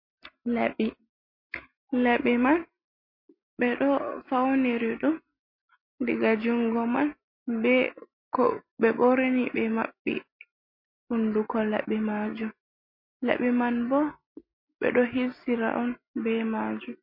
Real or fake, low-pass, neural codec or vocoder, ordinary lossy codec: real; 5.4 kHz; none; MP3, 32 kbps